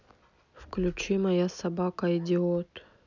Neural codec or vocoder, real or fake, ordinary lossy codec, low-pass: none; real; none; 7.2 kHz